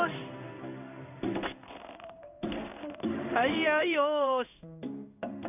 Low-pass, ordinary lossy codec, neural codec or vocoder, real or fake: 3.6 kHz; AAC, 32 kbps; codec, 16 kHz in and 24 kHz out, 1 kbps, XY-Tokenizer; fake